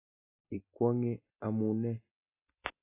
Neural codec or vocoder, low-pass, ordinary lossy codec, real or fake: none; 3.6 kHz; AAC, 24 kbps; real